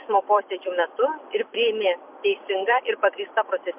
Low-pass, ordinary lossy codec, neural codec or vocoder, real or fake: 3.6 kHz; MP3, 32 kbps; vocoder, 44.1 kHz, 128 mel bands every 256 samples, BigVGAN v2; fake